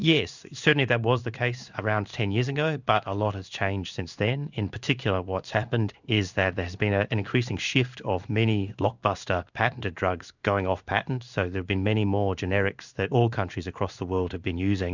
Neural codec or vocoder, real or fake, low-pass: codec, 16 kHz in and 24 kHz out, 1 kbps, XY-Tokenizer; fake; 7.2 kHz